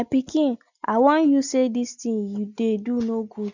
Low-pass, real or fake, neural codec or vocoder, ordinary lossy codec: 7.2 kHz; real; none; none